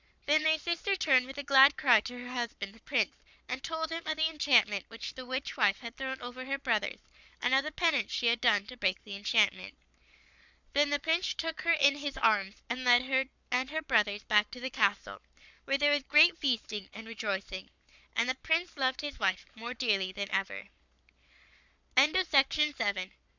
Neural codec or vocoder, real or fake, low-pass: codec, 44.1 kHz, 7.8 kbps, Pupu-Codec; fake; 7.2 kHz